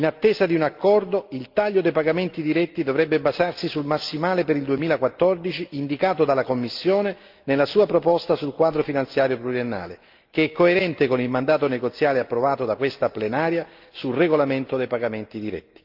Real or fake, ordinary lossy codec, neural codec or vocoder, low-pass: real; Opus, 32 kbps; none; 5.4 kHz